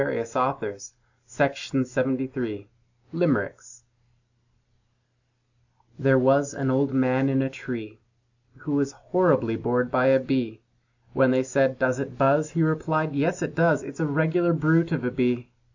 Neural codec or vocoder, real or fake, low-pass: none; real; 7.2 kHz